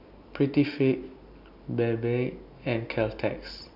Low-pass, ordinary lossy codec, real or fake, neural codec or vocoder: 5.4 kHz; none; real; none